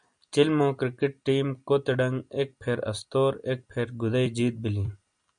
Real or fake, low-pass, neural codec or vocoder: real; 9.9 kHz; none